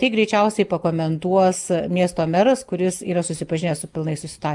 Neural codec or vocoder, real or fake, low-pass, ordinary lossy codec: none; real; 10.8 kHz; Opus, 32 kbps